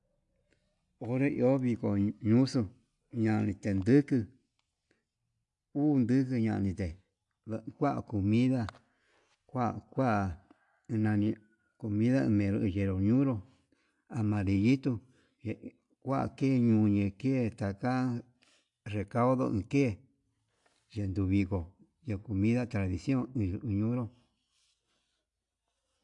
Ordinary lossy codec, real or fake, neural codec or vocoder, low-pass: AAC, 64 kbps; real; none; 10.8 kHz